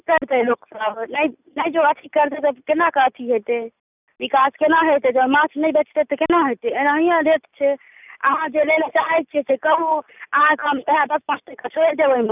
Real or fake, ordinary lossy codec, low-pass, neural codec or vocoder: real; none; 3.6 kHz; none